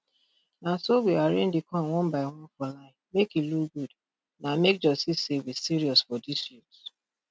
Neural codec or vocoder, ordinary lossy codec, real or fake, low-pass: none; none; real; none